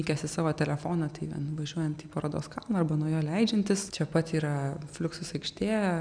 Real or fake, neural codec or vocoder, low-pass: real; none; 9.9 kHz